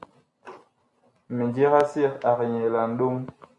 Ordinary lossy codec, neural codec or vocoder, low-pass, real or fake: AAC, 64 kbps; none; 10.8 kHz; real